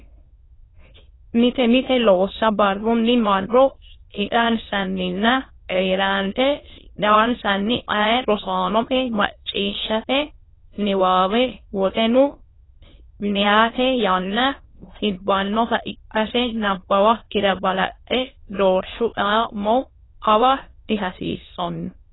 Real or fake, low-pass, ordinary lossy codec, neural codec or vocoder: fake; 7.2 kHz; AAC, 16 kbps; autoencoder, 22.05 kHz, a latent of 192 numbers a frame, VITS, trained on many speakers